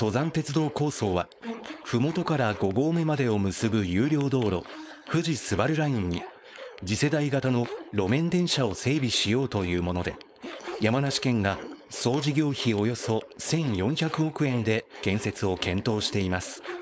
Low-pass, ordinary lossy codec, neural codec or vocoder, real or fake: none; none; codec, 16 kHz, 4.8 kbps, FACodec; fake